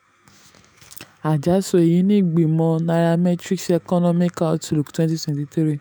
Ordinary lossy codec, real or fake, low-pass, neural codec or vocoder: none; fake; none; autoencoder, 48 kHz, 128 numbers a frame, DAC-VAE, trained on Japanese speech